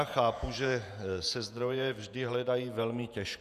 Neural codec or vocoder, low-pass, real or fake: vocoder, 48 kHz, 128 mel bands, Vocos; 14.4 kHz; fake